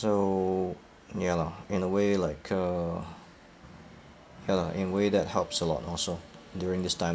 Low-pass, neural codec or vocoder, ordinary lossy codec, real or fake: none; none; none; real